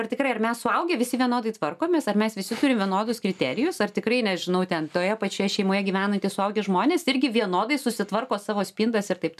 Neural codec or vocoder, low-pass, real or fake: none; 14.4 kHz; real